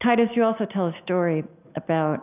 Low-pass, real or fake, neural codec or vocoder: 3.6 kHz; real; none